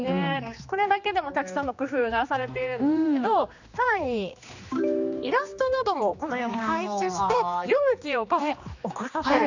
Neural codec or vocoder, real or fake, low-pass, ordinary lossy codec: codec, 16 kHz, 2 kbps, X-Codec, HuBERT features, trained on general audio; fake; 7.2 kHz; none